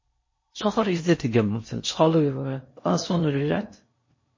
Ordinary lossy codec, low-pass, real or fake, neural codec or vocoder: MP3, 32 kbps; 7.2 kHz; fake; codec, 16 kHz in and 24 kHz out, 0.6 kbps, FocalCodec, streaming, 4096 codes